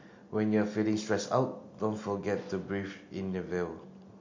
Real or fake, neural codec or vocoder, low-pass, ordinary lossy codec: real; none; 7.2 kHz; MP3, 48 kbps